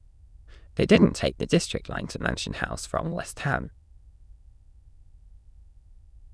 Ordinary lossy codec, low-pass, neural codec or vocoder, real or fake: none; none; autoencoder, 22.05 kHz, a latent of 192 numbers a frame, VITS, trained on many speakers; fake